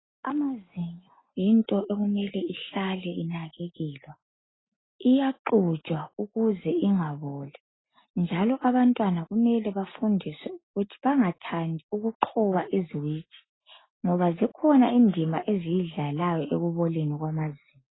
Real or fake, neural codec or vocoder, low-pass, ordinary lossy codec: real; none; 7.2 kHz; AAC, 16 kbps